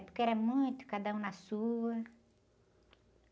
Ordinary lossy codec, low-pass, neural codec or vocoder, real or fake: none; none; none; real